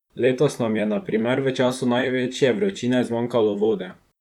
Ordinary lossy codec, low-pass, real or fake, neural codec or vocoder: none; 19.8 kHz; fake; vocoder, 44.1 kHz, 128 mel bands, Pupu-Vocoder